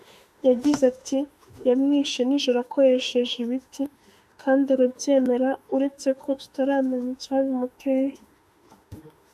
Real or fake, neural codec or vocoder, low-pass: fake; autoencoder, 48 kHz, 32 numbers a frame, DAC-VAE, trained on Japanese speech; 14.4 kHz